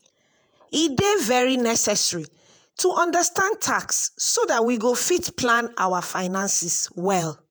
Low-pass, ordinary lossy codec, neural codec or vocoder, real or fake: none; none; vocoder, 48 kHz, 128 mel bands, Vocos; fake